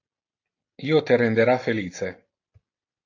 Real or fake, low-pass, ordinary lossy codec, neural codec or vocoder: real; 7.2 kHz; AAC, 48 kbps; none